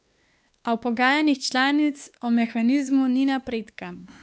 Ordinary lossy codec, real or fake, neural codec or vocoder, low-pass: none; fake; codec, 16 kHz, 2 kbps, X-Codec, WavLM features, trained on Multilingual LibriSpeech; none